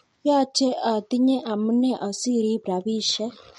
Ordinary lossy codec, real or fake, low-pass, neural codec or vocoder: MP3, 48 kbps; real; 19.8 kHz; none